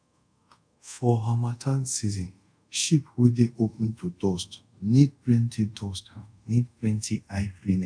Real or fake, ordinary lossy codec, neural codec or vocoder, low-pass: fake; none; codec, 24 kHz, 0.5 kbps, DualCodec; 9.9 kHz